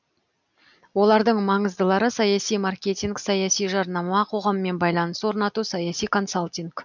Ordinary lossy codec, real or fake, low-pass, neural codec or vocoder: none; real; 7.2 kHz; none